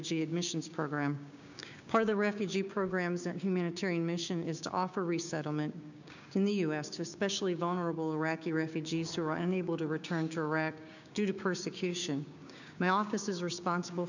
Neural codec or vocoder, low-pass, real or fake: codec, 16 kHz, 6 kbps, DAC; 7.2 kHz; fake